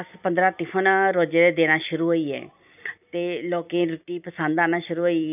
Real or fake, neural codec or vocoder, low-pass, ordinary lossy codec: fake; vocoder, 44.1 kHz, 128 mel bands every 256 samples, BigVGAN v2; 3.6 kHz; none